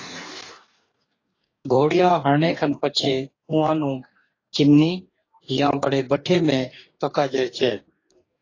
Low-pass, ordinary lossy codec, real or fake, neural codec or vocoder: 7.2 kHz; AAC, 32 kbps; fake; codec, 44.1 kHz, 2.6 kbps, DAC